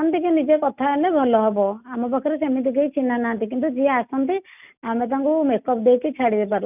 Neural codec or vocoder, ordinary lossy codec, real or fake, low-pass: none; none; real; 3.6 kHz